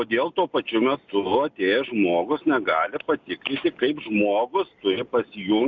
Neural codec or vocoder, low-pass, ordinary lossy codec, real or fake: none; 7.2 kHz; Opus, 64 kbps; real